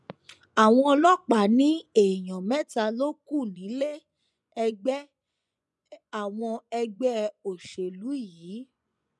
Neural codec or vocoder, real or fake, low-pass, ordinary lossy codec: vocoder, 24 kHz, 100 mel bands, Vocos; fake; none; none